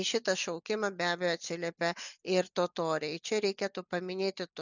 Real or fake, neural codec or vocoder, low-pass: real; none; 7.2 kHz